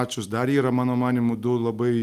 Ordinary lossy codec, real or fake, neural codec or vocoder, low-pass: Opus, 32 kbps; real; none; 14.4 kHz